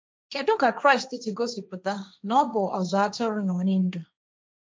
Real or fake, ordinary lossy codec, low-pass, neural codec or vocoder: fake; none; none; codec, 16 kHz, 1.1 kbps, Voila-Tokenizer